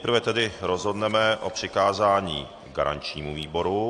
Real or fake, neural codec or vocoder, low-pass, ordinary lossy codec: real; none; 9.9 kHz; AAC, 48 kbps